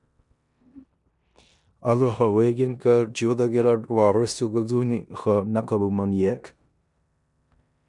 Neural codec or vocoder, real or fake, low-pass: codec, 16 kHz in and 24 kHz out, 0.9 kbps, LongCat-Audio-Codec, four codebook decoder; fake; 10.8 kHz